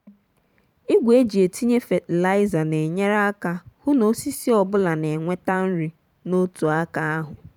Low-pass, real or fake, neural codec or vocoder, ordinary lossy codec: 19.8 kHz; fake; vocoder, 44.1 kHz, 128 mel bands every 512 samples, BigVGAN v2; none